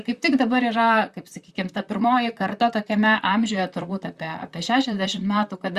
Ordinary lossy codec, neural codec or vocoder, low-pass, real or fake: Opus, 64 kbps; vocoder, 44.1 kHz, 128 mel bands, Pupu-Vocoder; 14.4 kHz; fake